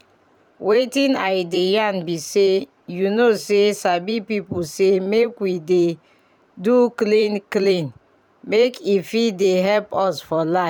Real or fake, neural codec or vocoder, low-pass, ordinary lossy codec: fake; vocoder, 44.1 kHz, 128 mel bands every 512 samples, BigVGAN v2; 19.8 kHz; none